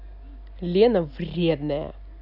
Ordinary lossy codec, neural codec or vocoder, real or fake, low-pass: none; none; real; 5.4 kHz